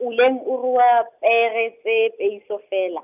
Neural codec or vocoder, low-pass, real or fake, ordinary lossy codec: none; 3.6 kHz; real; none